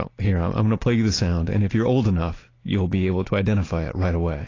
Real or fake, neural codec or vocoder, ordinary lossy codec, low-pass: real; none; AAC, 32 kbps; 7.2 kHz